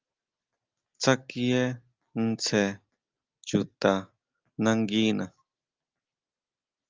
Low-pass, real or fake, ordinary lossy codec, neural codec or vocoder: 7.2 kHz; real; Opus, 32 kbps; none